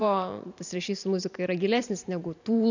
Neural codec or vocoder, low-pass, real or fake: vocoder, 44.1 kHz, 128 mel bands every 512 samples, BigVGAN v2; 7.2 kHz; fake